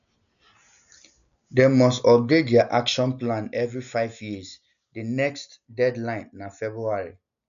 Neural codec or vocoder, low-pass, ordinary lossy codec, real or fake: none; 7.2 kHz; none; real